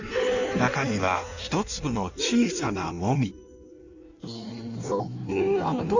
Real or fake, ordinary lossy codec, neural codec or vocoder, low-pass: fake; none; codec, 16 kHz in and 24 kHz out, 1.1 kbps, FireRedTTS-2 codec; 7.2 kHz